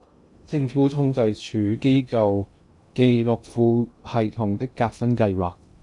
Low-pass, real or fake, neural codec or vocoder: 10.8 kHz; fake; codec, 16 kHz in and 24 kHz out, 0.6 kbps, FocalCodec, streaming, 2048 codes